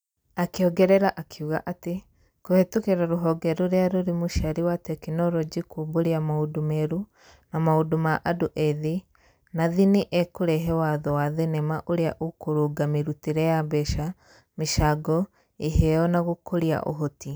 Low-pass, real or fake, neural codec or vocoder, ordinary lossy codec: none; real; none; none